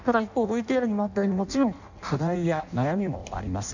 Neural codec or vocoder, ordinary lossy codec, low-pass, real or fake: codec, 16 kHz in and 24 kHz out, 0.6 kbps, FireRedTTS-2 codec; none; 7.2 kHz; fake